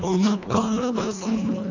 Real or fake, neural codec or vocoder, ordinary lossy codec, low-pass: fake; codec, 24 kHz, 1.5 kbps, HILCodec; none; 7.2 kHz